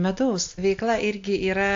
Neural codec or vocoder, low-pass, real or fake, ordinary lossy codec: none; 7.2 kHz; real; AAC, 48 kbps